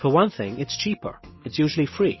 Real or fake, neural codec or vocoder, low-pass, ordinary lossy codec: real; none; 7.2 kHz; MP3, 24 kbps